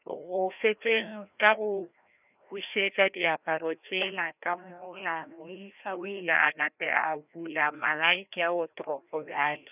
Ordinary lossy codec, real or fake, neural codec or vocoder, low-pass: none; fake; codec, 16 kHz, 1 kbps, FreqCodec, larger model; 3.6 kHz